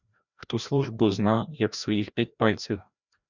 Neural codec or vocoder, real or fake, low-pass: codec, 16 kHz, 1 kbps, FreqCodec, larger model; fake; 7.2 kHz